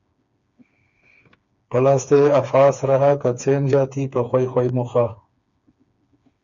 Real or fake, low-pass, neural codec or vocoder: fake; 7.2 kHz; codec, 16 kHz, 4 kbps, FreqCodec, smaller model